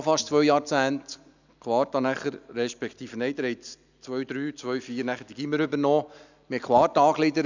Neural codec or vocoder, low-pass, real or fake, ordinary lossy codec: none; 7.2 kHz; real; none